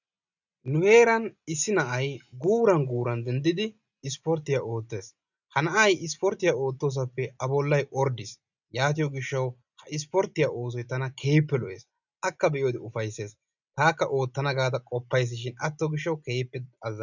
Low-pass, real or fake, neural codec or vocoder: 7.2 kHz; real; none